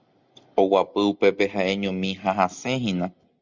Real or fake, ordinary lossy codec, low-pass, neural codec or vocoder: real; Opus, 64 kbps; 7.2 kHz; none